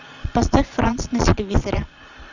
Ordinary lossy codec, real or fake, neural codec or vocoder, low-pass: Opus, 64 kbps; fake; vocoder, 22.05 kHz, 80 mel bands, Vocos; 7.2 kHz